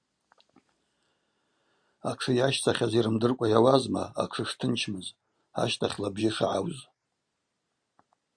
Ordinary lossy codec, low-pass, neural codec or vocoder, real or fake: Opus, 64 kbps; 9.9 kHz; none; real